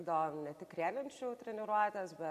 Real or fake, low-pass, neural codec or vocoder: real; 14.4 kHz; none